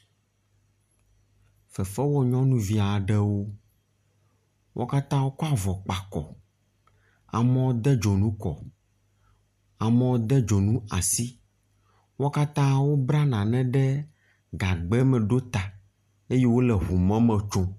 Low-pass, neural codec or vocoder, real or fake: 14.4 kHz; none; real